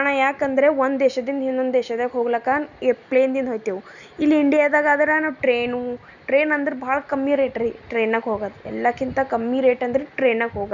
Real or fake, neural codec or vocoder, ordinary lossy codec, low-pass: real; none; none; 7.2 kHz